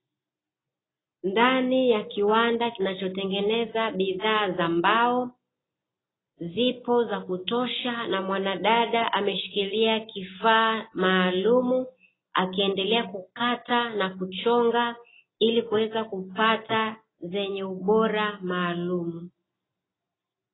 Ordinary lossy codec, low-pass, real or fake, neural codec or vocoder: AAC, 16 kbps; 7.2 kHz; real; none